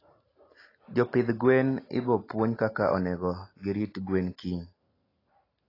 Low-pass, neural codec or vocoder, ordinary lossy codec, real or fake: 5.4 kHz; none; AAC, 24 kbps; real